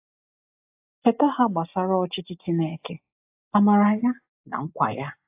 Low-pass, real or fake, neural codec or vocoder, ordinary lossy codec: 3.6 kHz; real; none; AAC, 32 kbps